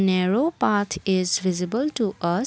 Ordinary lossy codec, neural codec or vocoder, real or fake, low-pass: none; none; real; none